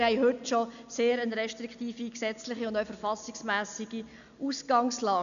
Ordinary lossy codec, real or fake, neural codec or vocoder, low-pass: none; real; none; 7.2 kHz